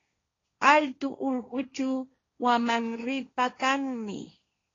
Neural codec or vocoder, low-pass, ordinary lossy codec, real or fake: codec, 16 kHz, 1.1 kbps, Voila-Tokenizer; 7.2 kHz; AAC, 32 kbps; fake